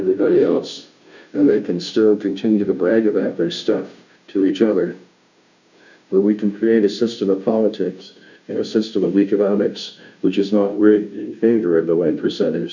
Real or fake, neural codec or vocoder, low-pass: fake; codec, 16 kHz, 0.5 kbps, FunCodec, trained on Chinese and English, 25 frames a second; 7.2 kHz